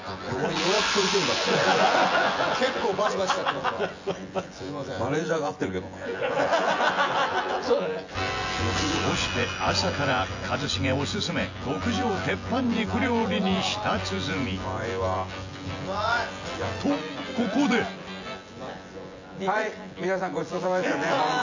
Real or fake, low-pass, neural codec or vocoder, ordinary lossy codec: fake; 7.2 kHz; vocoder, 24 kHz, 100 mel bands, Vocos; AAC, 48 kbps